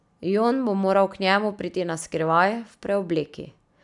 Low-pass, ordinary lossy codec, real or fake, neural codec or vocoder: 10.8 kHz; none; real; none